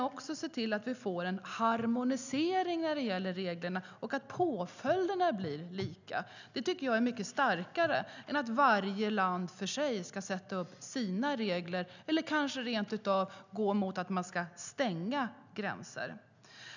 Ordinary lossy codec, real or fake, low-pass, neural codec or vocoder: none; real; 7.2 kHz; none